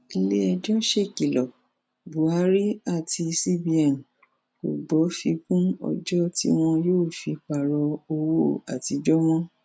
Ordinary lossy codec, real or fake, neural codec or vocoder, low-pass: none; real; none; none